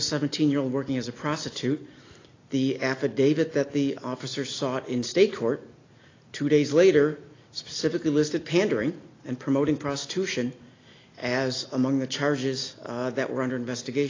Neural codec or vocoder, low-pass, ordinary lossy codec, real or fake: none; 7.2 kHz; AAC, 32 kbps; real